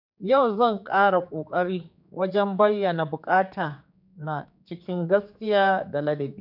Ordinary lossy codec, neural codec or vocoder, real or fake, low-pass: none; codec, 16 kHz, 4 kbps, X-Codec, HuBERT features, trained on general audio; fake; 5.4 kHz